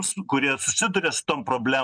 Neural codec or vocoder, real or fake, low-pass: none; real; 9.9 kHz